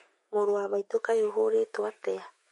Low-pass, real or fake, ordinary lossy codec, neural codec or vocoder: 19.8 kHz; fake; MP3, 48 kbps; autoencoder, 48 kHz, 128 numbers a frame, DAC-VAE, trained on Japanese speech